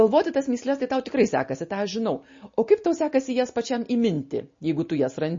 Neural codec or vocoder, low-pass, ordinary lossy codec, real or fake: none; 7.2 kHz; MP3, 32 kbps; real